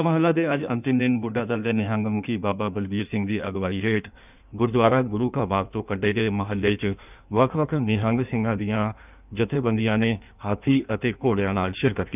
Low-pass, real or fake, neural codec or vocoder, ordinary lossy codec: 3.6 kHz; fake; codec, 16 kHz in and 24 kHz out, 1.1 kbps, FireRedTTS-2 codec; none